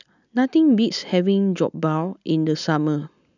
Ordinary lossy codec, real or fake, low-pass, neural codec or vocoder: none; real; 7.2 kHz; none